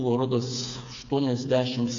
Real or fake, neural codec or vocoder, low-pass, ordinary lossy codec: fake; codec, 16 kHz, 4 kbps, FreqCodec, smaller model; 7.2 kHz; AAC, 48 kbps